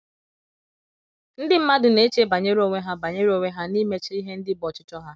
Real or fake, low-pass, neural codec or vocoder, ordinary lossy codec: real; none; none; none